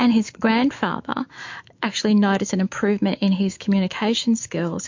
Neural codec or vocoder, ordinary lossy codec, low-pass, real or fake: autoencoder, 48 kHz, 128 numbers a frame, DAC-VAE, trained on Japanese speech; MP3, 48 kbps; 7.2 kHz; fake